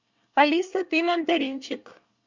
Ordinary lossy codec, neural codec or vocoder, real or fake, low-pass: Opus, 64 kbps; codec, 24 kHz, 1 kbps, SNAC; fake; 7.2 kHz